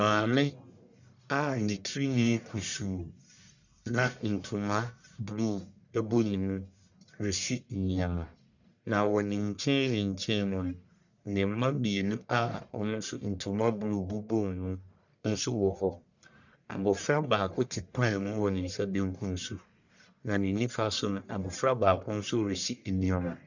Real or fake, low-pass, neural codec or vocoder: fake; 7.2 kHz; codec, 44.1 kHz, 1.7 kbps, Pupu-Codec